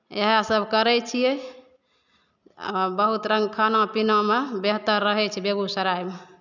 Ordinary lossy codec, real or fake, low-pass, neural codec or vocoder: none; real; 7.2 kHz; none